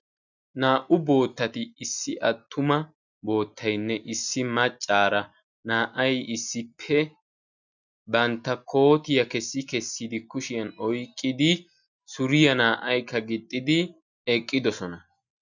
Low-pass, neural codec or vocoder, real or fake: 7.2 kHz; none; real